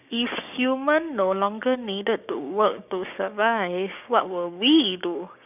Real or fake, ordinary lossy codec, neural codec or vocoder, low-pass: fake; none; codec, 16 kHz, 6 kbps, DAC; 3.6 kHz